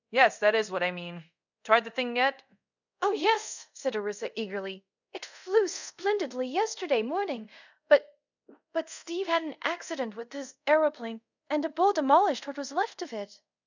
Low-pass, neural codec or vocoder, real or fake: 7.2 kHz; codec, 24 kHz, 0.5 kbps, DualCodec; fake